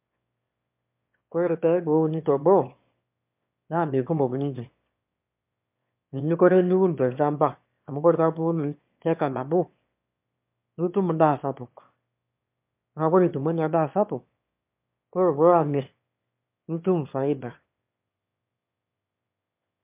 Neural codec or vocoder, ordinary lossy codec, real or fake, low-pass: autoencoder, 22.05 kHz, a latent of 192 numbers a frame, VITS, trained on one speaker; MP3, 32 kbps; fake; 3.6 kHz